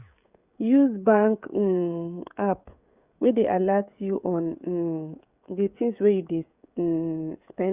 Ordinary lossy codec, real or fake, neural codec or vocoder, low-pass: Opus, 64 kbps; fake; codec, 16 kHz, 16 kbps, FreqCodec, smaller model; 3.6 kHz